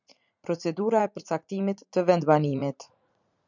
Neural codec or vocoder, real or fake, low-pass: vocoder, 44.1 kHz, 80 mel bands, Vocos; fake; 7.2 kHz